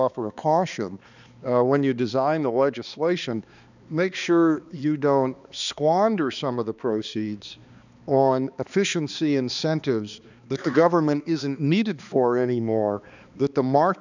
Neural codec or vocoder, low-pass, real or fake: codec, 16 kHz, 2 kbps, X-Codec, HuBERT features, trained on balanced general audio; 7.2 kHz; fake